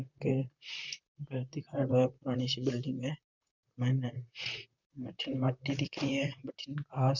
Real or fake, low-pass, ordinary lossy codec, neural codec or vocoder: fake; 7.2 kHz; none; vocoder, 44.1 kHz, 128 mel bands, Pupu-Vocoder